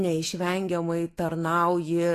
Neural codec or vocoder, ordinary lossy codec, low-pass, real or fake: autoencoder, 48 kHz, 128 numbers a frame, DAC-VAE, trained on Japanese speech; AAC, 48 kbps; 14.4 kHz; fake